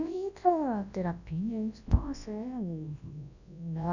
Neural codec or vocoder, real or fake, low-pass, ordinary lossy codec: codec, 24 kHz, 0.9 kbps, WavTokenizer, large speech release; fake; 7.2 kHz; none